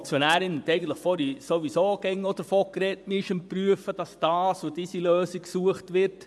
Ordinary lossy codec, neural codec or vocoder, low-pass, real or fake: none; vocoder, 24 kHz, 100 mel bands, Vocos; none; fake